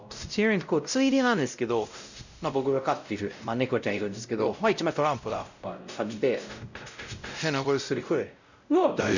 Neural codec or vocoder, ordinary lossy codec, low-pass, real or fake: codec, 16 kHz, 0.5 kbps, X-Codec, WavLM features, trained on Multilingual LibriSpeech; none; 7.2 kHz; fake